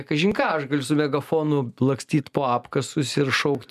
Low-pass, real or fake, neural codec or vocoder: 14.4 kHz; real; none